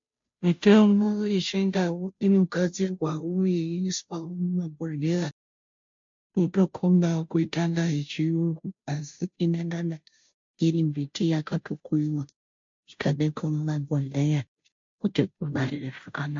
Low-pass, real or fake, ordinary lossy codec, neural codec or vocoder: 7.2 kHz; fake; MP3, 48 kbps; codec, 16 kHz, 0.5 kbps, FunCodec, trained on Chinese and English, 25 frames a second